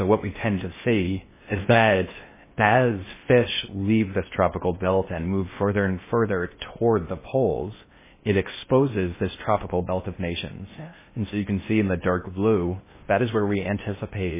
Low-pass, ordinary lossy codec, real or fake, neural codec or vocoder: 3.6 kHz; MP3, 16 kbps; fake; codec, 16 kHz in and 24 kHz out, 0.6 kbps, FocalCodec, streaming, 4096 codes